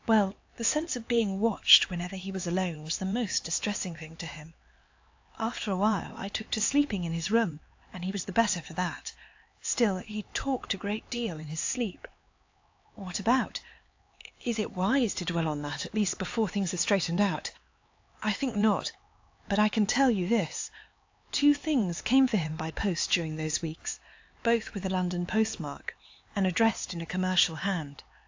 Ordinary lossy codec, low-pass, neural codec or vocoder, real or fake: AAC, 48 kbps; 7.2 kHz; codec, 16 kHz, 4 kbps, X-Codec, HuBERT features, trained on LibriSpeech; fake